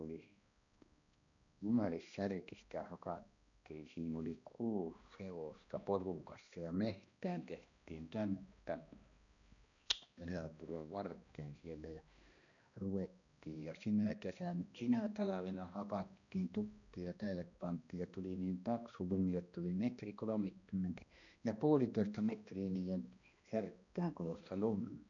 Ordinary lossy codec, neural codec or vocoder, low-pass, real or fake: none; codec, 16 kHz, 1 kbps, X-Codec, HuBERT features, trained on balanced general audio; 7.2 kHz; fake